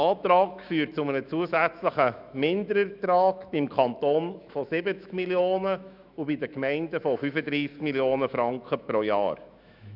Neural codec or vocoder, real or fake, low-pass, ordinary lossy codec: autoencoder, 48 kHz, 128 numbers a frame, DAC-VAE, trained on Japanese speech; fake; 5.4 kHz; none